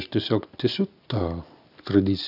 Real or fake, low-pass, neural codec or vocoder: real; 5.4 kHz; none